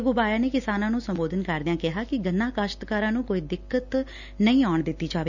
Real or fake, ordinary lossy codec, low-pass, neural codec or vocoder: real; none; 7.2 kHz; none